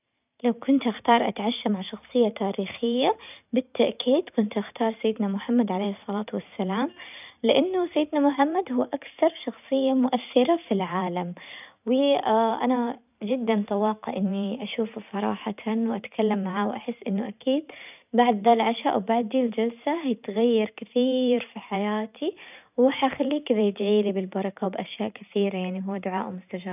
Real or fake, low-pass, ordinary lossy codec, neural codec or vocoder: fake; 3.6 kHz; none; vocoder, 44.1 kHz, 128 mel bands every 512 samples, BigVGAN v2